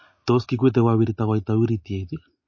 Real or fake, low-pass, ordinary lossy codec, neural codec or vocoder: real; 7.2 kHz; MP3, 32 kbps; none